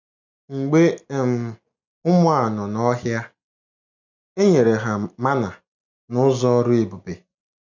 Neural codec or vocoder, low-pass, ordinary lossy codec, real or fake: none; 7.2 kHz; none; real